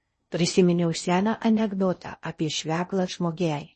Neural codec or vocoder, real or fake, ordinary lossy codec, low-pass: codec, 16 kHz in and 24 kHz out, 0.6 kbps, FocalCodec, streaming, 4096 codes; fake; MP3, 32 kbps; 10.8 kHz